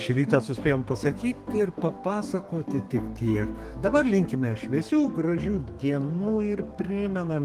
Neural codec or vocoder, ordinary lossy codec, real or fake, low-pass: codec, 32 kHz, 1.9 kbps, SNAC; Opus, 24 kbps; fake; 14.4 kHz